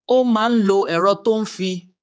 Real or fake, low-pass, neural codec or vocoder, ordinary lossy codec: fake; none; codec, 16 kHz, 4 kbps, X-Codec, HuBERT features, trained on general audio; none